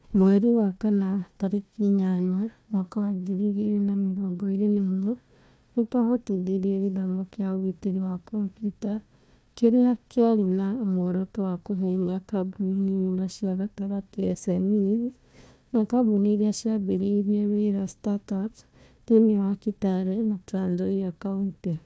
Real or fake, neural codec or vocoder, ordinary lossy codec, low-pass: fake; codec, 16 kHz, 1 kbps, FunCodec, trained on Chinese and English, 50 frames a second; none; none